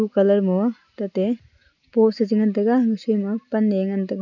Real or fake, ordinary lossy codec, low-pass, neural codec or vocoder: real; none; 7.2 kHz; none